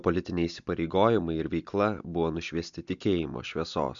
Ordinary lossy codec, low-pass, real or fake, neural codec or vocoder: MP3, 64 kbps; 7.2 kHz; real; none